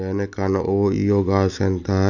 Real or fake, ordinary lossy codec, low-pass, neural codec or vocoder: real; none; 7.2 kHz; none